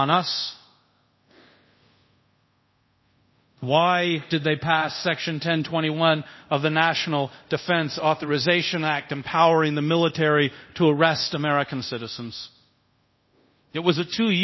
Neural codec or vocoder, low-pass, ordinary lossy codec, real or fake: codec, 24 kHz, 0.5 kbps, DualCodec; 7.2 kHz; MP3, 24 kbps; fake